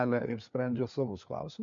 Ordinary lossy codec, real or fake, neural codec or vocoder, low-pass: MP3, 48 kbps; fake; codec, 16 kHz, 4 kbps, FunCodec, trained on LibriTTS, 50 frames a second; 7.2 kHz